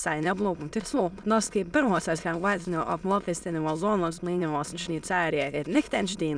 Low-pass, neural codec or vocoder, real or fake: 9.9 kHz; autoencoder, 22.05 kHz, a latent of 192 numbers a frame, VITS, trained on many speakers; fake